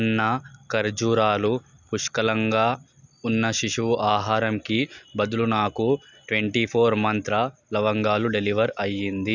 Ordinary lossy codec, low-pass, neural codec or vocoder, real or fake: none; 7.2 kHz; none; real